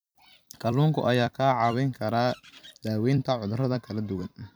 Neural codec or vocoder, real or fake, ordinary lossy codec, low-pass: vocoder, 44.1 kHz, 128 mel bands every 256 samples, BigVGAN v2; fake; none; none